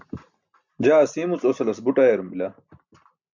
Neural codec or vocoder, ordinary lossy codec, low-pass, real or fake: none; MP3, 64 kbps; 7.2 kHz; real